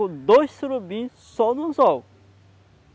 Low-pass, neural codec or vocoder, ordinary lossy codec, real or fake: none; none; none; real